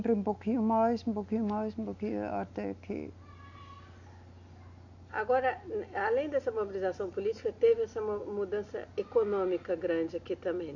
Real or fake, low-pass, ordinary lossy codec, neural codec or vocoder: real; 7.2 kHz; none; none